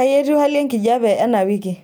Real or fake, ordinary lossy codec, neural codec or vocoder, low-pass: real; none; none; none